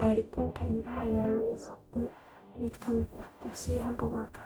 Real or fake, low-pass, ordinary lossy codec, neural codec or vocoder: fake; none; none; codec, 44.1 kHz, 0.9 kbps, DAC